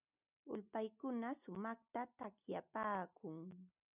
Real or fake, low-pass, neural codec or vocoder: real; 3.6 kHz; none